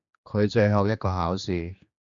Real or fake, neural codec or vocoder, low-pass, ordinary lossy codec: fake; codec, 16 kHz, 2 kbps, X-Codec, HuBERT features, trained on general audio; 7.2 kHz; Opus, 64 kbps